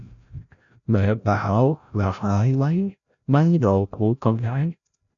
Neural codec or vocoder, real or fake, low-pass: codec, 16 kHz, 0.5 kbps, FreqCodec, larger model; fake; 7.2 kHz